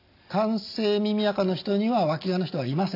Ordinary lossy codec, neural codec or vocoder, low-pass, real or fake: AAC, 32 kbps; none; 5.4 kHz; real